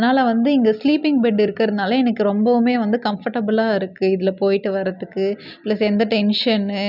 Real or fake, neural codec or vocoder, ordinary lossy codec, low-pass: real; none; none; 5.4 kHz